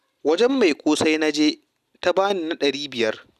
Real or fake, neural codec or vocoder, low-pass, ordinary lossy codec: real; none; 14.4 kHz; none